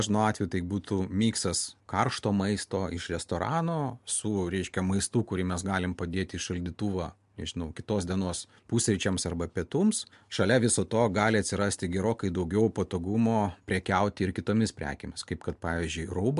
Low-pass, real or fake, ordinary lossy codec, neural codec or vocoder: 10.8 kHz; real; MP3, 64 kbps; none